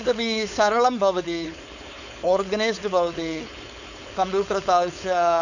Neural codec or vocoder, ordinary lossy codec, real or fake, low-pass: codec, 16 kHz, 4.8 kbps, FACodec; none; fake; 7.2 kHz